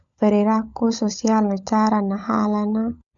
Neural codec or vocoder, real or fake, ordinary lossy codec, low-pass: codec, 16 kHz, 16 kbps, FunCodec, trained on LibriTTS, 50 frames a second; fake; none; 7.2 kHz